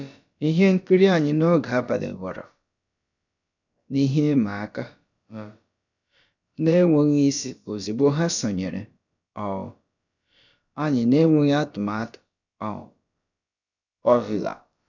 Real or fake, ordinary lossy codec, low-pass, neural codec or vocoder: fake; none; 7.2 kHz; codec, 16 kHz, about 1 kbps, DyCAST, with the encoder's durations